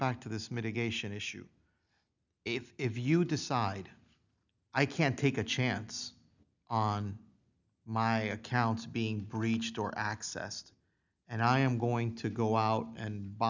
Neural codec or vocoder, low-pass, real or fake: none; 7.2 kHz; real